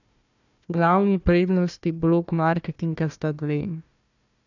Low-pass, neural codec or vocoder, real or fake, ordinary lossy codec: 7.2 kHz; codec, 16 kHz, 1 kbps, FunCodec, trained on Chinese and English, 50 frames a second; fake; none